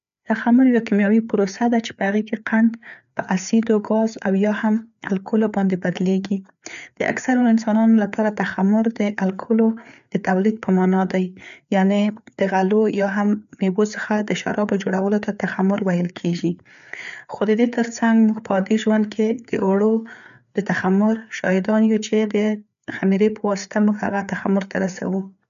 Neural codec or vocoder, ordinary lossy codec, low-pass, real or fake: codec, 16 kHz, 4 kbps, FreqCodec, larger model; none; 7.2 kHz; fake